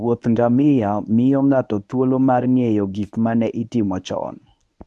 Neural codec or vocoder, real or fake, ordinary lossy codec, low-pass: codec, 24 kHz, 0.9 kbps, WavTokenizer, medium speech release version 1; fake; none; none